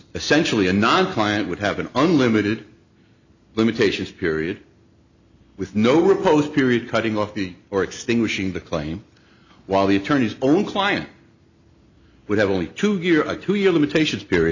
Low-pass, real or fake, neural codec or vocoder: 7.2 kHz; real; none